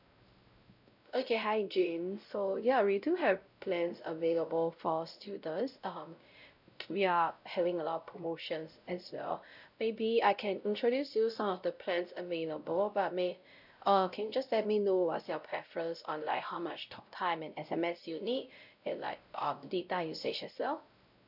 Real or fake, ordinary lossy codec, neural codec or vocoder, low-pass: fake; none; codec, 16 kHz, 0.5 kbps, X-Codec, WavLM features, trained on Multilingual LibriSpeech; 5.4 kHz